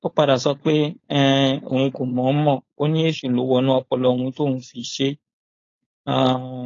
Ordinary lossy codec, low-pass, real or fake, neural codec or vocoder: AAC, 32 kbps; 7.2 kHz; fake; codec, 16 kHz, 4.8 kbps, FACodec